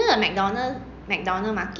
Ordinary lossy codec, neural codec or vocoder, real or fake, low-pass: none; none; real; 7.2 kHz